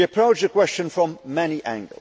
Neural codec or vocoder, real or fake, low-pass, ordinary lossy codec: none; real; none; none